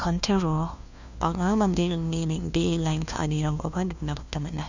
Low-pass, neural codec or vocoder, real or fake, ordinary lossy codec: 7.2 kHz; codec, 16 kHz, 1 kbps, FunCodec, trained on LibriTTS, 50 frames a second; fake; none